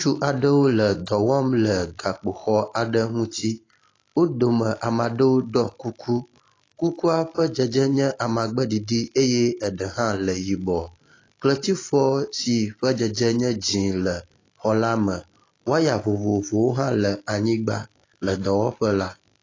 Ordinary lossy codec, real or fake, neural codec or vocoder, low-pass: AAC, 32 kbps; real; none; 7.2 kHz